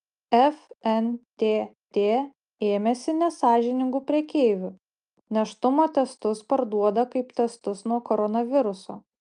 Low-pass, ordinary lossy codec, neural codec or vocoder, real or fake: 9.9 kHz; Opus, 32 kbps; none; real